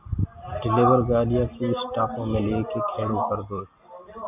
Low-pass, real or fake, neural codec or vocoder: 3.6 kHz; real; none